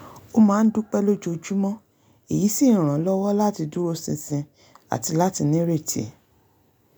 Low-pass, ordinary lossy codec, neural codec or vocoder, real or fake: none; none; none; real